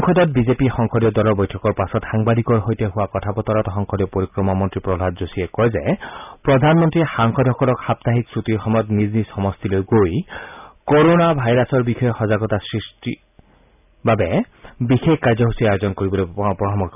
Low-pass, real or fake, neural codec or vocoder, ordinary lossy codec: 3.6 kHz; real; none; none